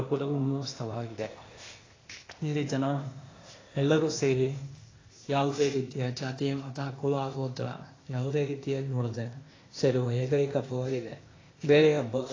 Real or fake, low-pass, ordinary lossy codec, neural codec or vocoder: fake; 7.2 kHz; AAC, 32 kbps; codec, 16 kHz, 0.8 kbps, ZipCodec